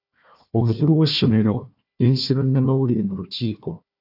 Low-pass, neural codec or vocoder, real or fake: 5.4 kHz; codec, 16 kHz, 1 kbps, FunCodec, trained on Chinese and English, 50 frames a second; fake